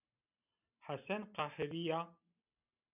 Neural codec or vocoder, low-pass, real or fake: none; 3.6 kHz; real